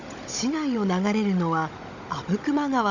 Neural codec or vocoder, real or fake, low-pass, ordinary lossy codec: codec, 16 kHz, 16 kbps, FunCodec, trained on Chinese and English, 50 frames a second; fake; 7.2 kHz; none